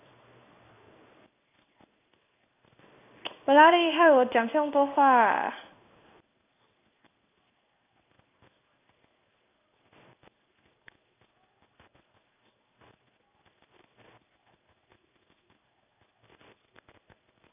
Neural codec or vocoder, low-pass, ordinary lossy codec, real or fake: codec, 16 kHz in and 24 kHz out, 1 kbps, XY-Tokenizer; 3.6 kHz; none; fake